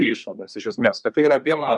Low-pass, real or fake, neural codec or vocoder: 10.8 kHz; fake; codec, 24 kHz, 1 kbps, SNAC